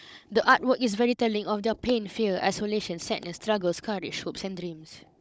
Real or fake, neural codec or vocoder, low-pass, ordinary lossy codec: fake; codec, 16 kHz, 16 kbps, FunCodec, trained on Chinese and English, 50 frames a second; none; none